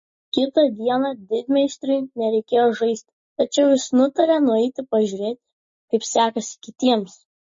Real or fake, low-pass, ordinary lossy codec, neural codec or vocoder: real; 7.2 kHz; MP3, 32 kbps; none